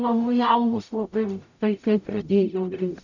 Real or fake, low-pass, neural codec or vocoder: fake; 7.2 kHz; codec, 44.1 kHz, 0.9 kbps, DAC